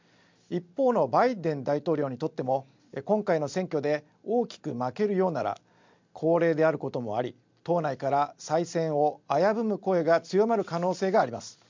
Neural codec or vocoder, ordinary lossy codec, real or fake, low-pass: none; MP3, 64 kbps; real; 7.2 kHz